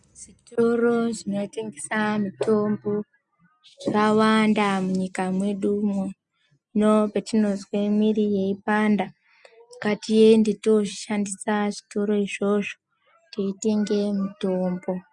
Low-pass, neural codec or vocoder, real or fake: 10.8 kHz; none; real